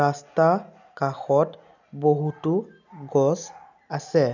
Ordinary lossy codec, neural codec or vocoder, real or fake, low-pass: none; none; real; 7.2 kHz